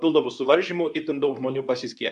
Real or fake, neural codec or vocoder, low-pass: fake; codec, 24 kHz, 0.9 kbps, WavTokenizer, medium speech release version 2; 10.8 kHz